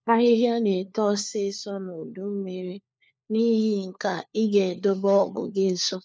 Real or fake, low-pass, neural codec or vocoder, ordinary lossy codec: fake; none; codec, 16 kHz, 4 kbps, FunCodec, trained on LibriTTS, 50 frames a second; none